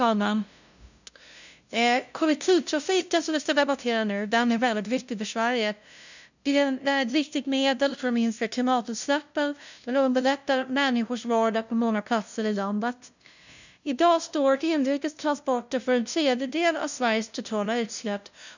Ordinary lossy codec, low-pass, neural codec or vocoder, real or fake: none; 7.2 kHz; codec, 16 kHz, 0.5 kbps, FunCodec, trained on LibriTTS, 25 frames a second; fake